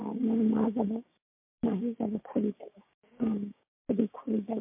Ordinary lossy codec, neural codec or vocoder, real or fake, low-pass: MP3, 32 kbps; codec, 16 kHz, 6 kbps, DAC; fake; 3.6 kHz